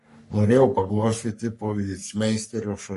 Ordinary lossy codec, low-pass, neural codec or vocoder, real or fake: MP3, 48 kbps; 14.4 kHz; codec, 44.1 kHz, 3.4 kbps, Pupu-Codec; fake